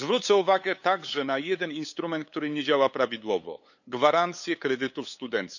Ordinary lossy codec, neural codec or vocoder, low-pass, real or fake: none; codec, 16 kHz, 8 kbps, FunCodec, trained on LibriTTS, 25 frames a second; 7.2 kHz; fake